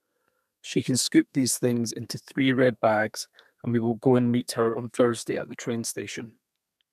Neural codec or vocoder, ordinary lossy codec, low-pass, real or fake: codec, 32 kHz, 1.9 kbps, SNAC; none; 14.4 kHz; fake